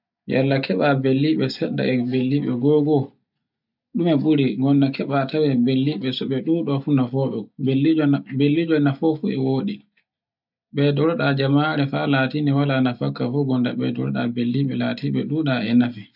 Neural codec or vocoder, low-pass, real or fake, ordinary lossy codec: none; 5.4 kHz; real; none